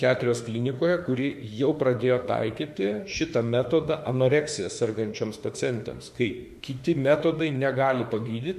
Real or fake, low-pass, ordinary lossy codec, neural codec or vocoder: fake; 14.4 kHz; MP3, 96 kbps; autoencoder, 48 kHz, 32 numbers a frame, DAC-VAE, trained on Japanese speech